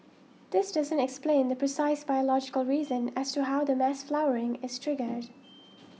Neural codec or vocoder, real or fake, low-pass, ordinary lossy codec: none; real; none; none